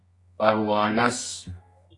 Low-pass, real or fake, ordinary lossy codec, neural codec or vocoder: 10.8 kHz; fake; AAC, 48 kbps; codec, 24 kHz, 0.9 kbps, WavTokenizer, medium music audio release